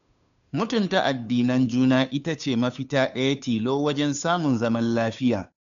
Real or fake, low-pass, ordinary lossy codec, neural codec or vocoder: fake; 7.2 kHz; none; codec, 16 kHz, 2 kbps, FunCodec, trained on Chinese and English, 25 frames a second